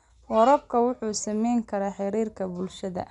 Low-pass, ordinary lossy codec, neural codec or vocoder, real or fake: 10.8 kHz; none; none; real